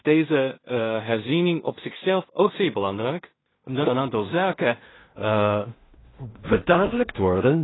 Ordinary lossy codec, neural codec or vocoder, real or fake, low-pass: AAC, 16 kbps; codec, 16 kHz in and 24 kHz out, 0.4 kbps, LongCat-Audio-Codec, two codebook decoder; fake; 7.2 kHz